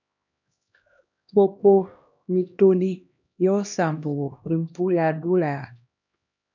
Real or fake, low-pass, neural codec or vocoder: fake; 7.2 kHz; codec, 16 kHz, 1 kbps, X-Codec, HuBERT features, trained on LibriSpeech